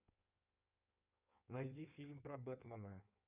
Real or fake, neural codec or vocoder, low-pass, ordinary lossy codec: fake; codec, 16 kHz in and 24 kHz out, 1.1 kbps, FireRedTTS-2 codec; 3.6 kHz; none